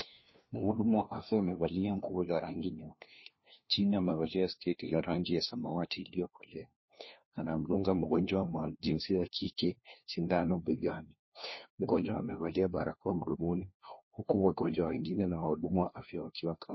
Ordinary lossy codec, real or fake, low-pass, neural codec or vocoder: MP3, 24 kbps; fake; 7.2 kHz; codec, 16 kHz, 1 kbps, FunCodec, trained on LibriTTS, 50 frames a second